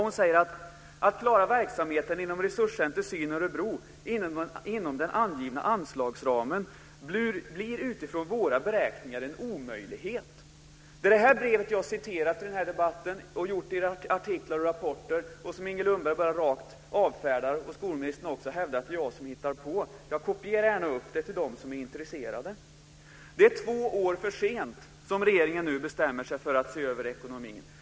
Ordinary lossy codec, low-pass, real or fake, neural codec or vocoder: none; none; real; none